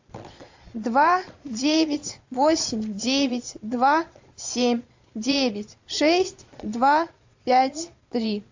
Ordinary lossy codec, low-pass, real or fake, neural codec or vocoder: AAC, 48 kbps; 7.2 kHz; fake; vocoder, 22.05 kHz, 80 mel bands, Vocos